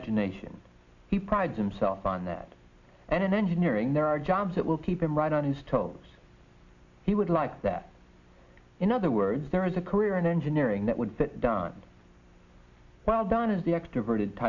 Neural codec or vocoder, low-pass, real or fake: none; 7.2 kHz; real